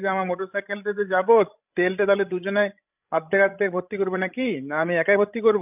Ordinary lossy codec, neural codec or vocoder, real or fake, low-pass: none; codec, 16 kHz, 16 kbps, FreqCodec, larger model; fake; 3.6 kHz